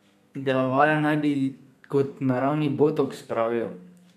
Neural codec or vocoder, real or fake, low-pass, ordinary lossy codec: codec, 32 kHz, 1.9 kbps, SNAC; fake; 14.4 kHz; none